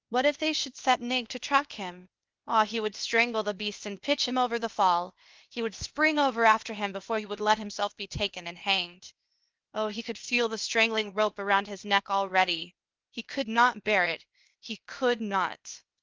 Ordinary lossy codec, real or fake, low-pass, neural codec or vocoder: Opus, 24 kbps; fake; 7.2 kHz; codec, 16 kHz, 0.8 kbps, ZipCodec